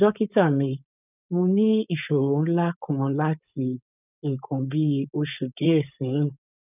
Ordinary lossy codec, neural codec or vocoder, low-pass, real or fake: none; codec, 16 kHz, 4.8 kbps, FACodec; 3.6 kHz; fake